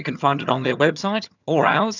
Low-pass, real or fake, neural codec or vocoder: 7.2 kHz; fake; vocoder, 22.05 kHz, 80 mel bands, HiFi-GAN